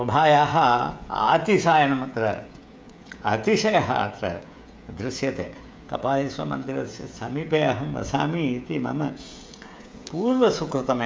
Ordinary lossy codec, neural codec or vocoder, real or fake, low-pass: none; codec, 16 kHz, 16 kbps, FreqCodec, smaller model; fake; none